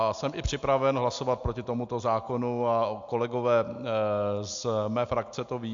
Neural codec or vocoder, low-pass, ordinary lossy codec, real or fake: none; 7.2 kHz; AAC, 64 kbps; real